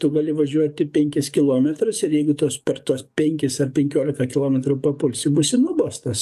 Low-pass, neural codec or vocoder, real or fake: 14.4 kHz; codec, 44.1 kHz, 7.8 kbps, DAC; fake